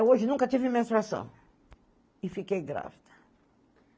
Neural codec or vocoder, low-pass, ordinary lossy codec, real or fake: none; none; none; real